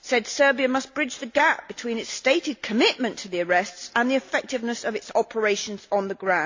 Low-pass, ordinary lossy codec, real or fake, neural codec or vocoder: 7.2 kHz; AAC, 48 kbps; real; none